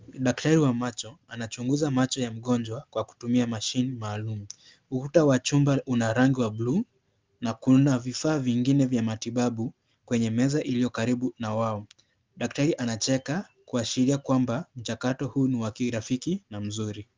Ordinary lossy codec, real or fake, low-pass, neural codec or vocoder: Opus, 24 kbps; real; 7.2 kHz; none